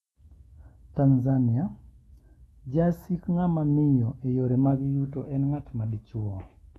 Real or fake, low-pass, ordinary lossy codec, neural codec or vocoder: fake; 19.8 kHz; AAC, 32 kbps; autoencoder, 48 kHz, 128 numbers a frame, DAC-VAE, trained on Japanese speech